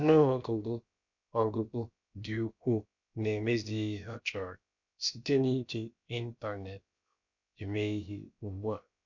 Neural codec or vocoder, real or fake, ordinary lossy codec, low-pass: codec, 16 kHz, about 1 kbps, DyCAST, with the encoder's durations; fake; none; 7.2 kHz